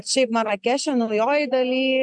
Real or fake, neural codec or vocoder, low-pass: real; none; 10.8 kHz